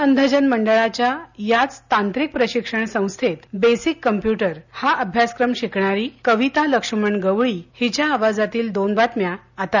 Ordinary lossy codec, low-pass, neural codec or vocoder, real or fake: none; 7.2 kHz; none; real